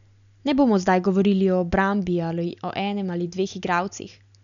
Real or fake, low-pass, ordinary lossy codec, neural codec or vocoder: real; 7.2 kHz; none; none